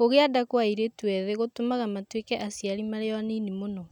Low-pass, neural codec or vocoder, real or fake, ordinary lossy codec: 19.8 kHz; none; real; none